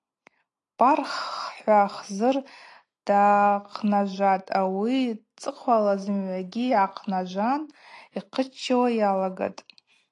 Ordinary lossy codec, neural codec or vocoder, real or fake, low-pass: AAC, 48 kbps; none; real; 10.8 kHz